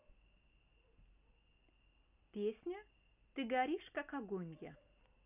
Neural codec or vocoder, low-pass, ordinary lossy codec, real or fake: none; 3.6 kHz; none; real